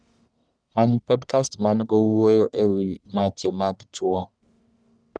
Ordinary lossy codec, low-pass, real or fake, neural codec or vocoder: none; 9.9 kHz; fake; codec, 44.1 kHz, 1.7 kbps, Pupu-Codec